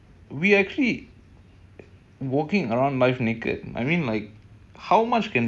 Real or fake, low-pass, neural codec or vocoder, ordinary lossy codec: real; none; none; none